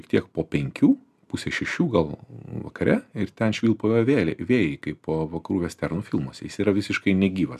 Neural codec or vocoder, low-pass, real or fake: none; 14.4 kHz; real